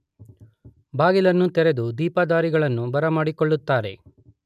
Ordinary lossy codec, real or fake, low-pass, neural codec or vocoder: none; real; 14.4 kHz; none